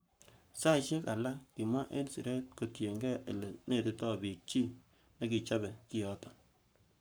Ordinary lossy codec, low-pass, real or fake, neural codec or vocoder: none; none; fake; codec, 44.1 kHz, 7.8 kbps, Pupu-Codec